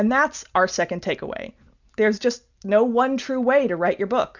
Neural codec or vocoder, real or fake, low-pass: none; real; 7.2 kHz